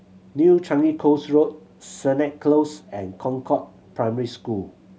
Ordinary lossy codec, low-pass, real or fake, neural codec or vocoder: none; none; real; none